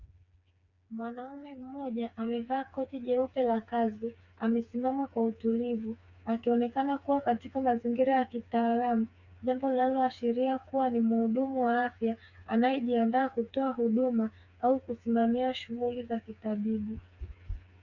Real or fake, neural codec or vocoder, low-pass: fake; codec, 16 kHz, 4 kbps, FreqCodec, smaller model; 7.2 kHz